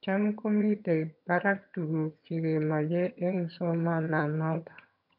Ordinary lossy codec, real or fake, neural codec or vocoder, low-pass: none; fake; vocoder, 22.05 kHz, 80 mel bands, HiFi-GAN; 5.4 kHz